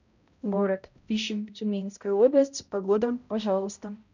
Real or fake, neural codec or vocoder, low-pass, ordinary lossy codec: fake; codec, 16 kHz, 0.5 kbps, X-Codec, HuBERT features, trained on balanced general audio; 7.2 kHz; none